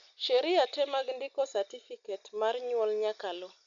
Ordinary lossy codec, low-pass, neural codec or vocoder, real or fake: none; 7.2 kHz; none; real